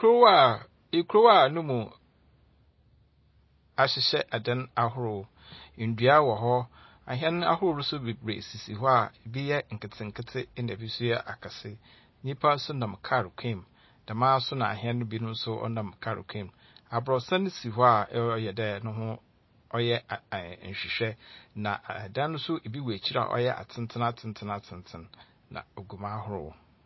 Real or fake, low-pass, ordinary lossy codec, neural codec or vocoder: real; 7.2 kHz; MP3, 24 kbps; none